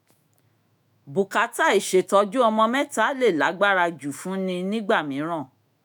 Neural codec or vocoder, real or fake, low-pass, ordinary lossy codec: autoencoder, 48 kHz, 128 numbers a frame, DAC-VAE, trained on Japanese speech; fake; none; none